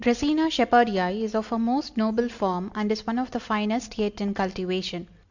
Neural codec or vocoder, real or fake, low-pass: none; real; 7.2 kHz